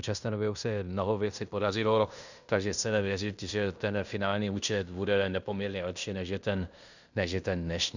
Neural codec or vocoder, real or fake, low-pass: codec, 16 kHz in and 24 kHz out, 0.9 kbps, LongCat-Audio-Codec, fine tuned four codebook decoder; fake; 7.2 kHz